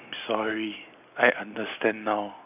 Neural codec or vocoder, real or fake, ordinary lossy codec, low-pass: none; real; none; 3.6 kHz